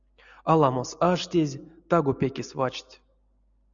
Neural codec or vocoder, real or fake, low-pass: none; real; 7.2 kHz